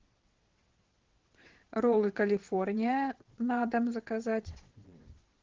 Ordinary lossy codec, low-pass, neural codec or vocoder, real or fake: Opus, 16 kbps; 7.2 kHz; vocoder, 22.05 kHz, 80 mel bands, WaveNeXt; fake